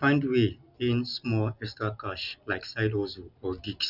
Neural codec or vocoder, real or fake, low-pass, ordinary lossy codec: none; real; 5.4 kHz; none